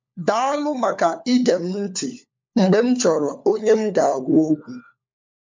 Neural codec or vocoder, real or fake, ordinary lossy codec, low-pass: codec, 16 kHz, 4 kbps, FunCodec, trained on LibriTTS, 50 frames a second; fake; MP3, 64 kbps; 7.2 kHz